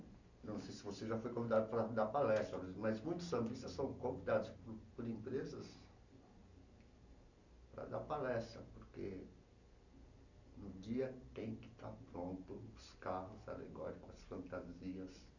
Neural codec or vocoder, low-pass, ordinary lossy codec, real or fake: none; 7.2 kHz; none; real